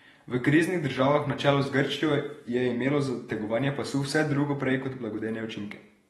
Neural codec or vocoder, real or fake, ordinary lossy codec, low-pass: vocoder, 48 kHz, 128 mel bands, Vocos; fake; AAC, 32 kbps; 19.8 kHz